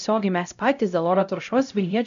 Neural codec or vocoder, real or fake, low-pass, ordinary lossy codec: codec, 16 kHz, 0.5 kbps, X-Codec, HuBERT features, trained on LibriSpeech; fake; 7.2 kHz; AAC, 96 kbps